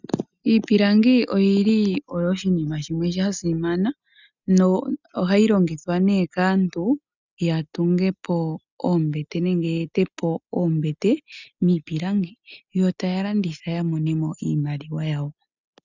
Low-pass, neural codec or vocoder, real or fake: 7.2 kHz; none; real